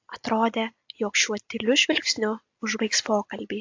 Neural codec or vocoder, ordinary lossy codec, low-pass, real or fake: none; AAC, 48 kbps; 7.2 kHz; real